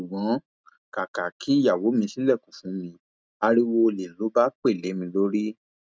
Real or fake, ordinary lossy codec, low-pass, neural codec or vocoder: real; none; none; none